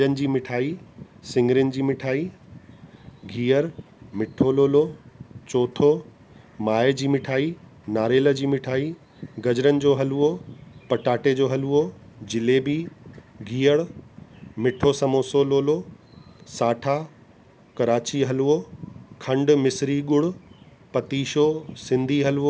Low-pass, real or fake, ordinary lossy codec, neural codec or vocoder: none; real; none; none